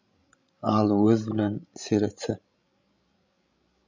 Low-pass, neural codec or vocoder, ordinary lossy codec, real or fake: 7.2 kHz; codec, 16 kHz, 16 kbps, FreqCodec, larger model; MP3, 64 kbps; fake